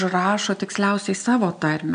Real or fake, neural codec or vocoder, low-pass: real; none; 9.9 kHz